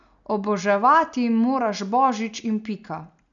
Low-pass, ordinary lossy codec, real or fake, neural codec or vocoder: 7.2 kHz; none; real; none